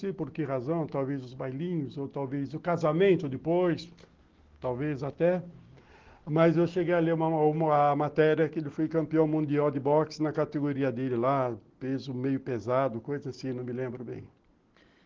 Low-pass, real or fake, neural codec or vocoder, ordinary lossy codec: 7.2 kHz; real; none; Opus, 16 kbps